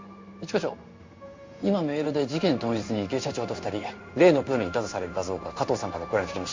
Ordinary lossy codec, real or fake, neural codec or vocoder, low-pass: MP3, 48 kbps; fake; codec, 16 kHz in and 24 kHz out, 1 kbps, XY-Tokenizer; 7.2 kHz